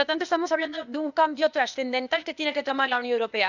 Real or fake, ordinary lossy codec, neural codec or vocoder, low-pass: fake; none; codec, 16 kHz, 0.8 kbps, ZipCodec; 7.2 kHz